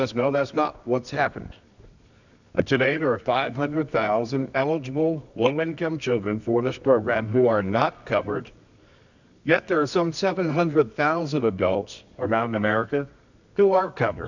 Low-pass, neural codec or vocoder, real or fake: 7.2 kHz; codec, 24 kHz, 0.9 kbps, WavTokenizer, medium music audio release; fake